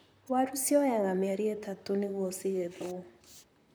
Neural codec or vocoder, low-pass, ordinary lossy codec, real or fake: vocoder, 44.1 kHz, 128 mel bands, Pupu-Vocoder; none; none; fake